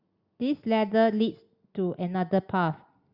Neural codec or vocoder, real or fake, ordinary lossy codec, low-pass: none; real; Opus, 64 kbps; 5.4 kHz